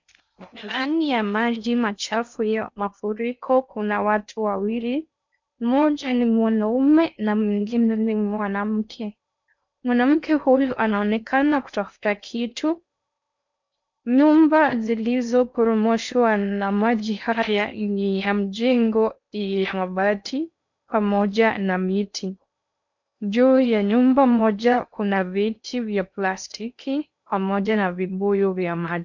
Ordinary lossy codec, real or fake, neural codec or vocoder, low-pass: MP3, 64 kbps; fake; codec, 16 kHz in and 24 kHz out, 0.6 kbps, FocalCodec, streaming, 4096 codes; 7.2 kHz